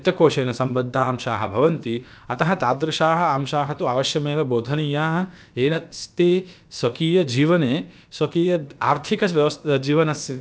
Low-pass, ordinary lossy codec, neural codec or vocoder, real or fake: none; none; codec, 16 kHz, about 1 kbps, DyCAST, with the encoder's durations; fake